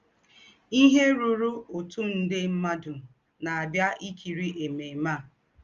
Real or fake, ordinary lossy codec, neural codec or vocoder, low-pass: real; Opus, 32 kbps; none; 7.2 kHz